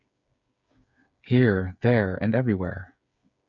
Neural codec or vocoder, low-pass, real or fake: codec, 16 kHz, 8 kbps, FreqCodec, smaller model; 7.2 kHz; fake